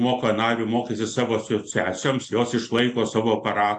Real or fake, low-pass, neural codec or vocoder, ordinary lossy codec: real; 10.8 kHz; none; AAC, 48 kbps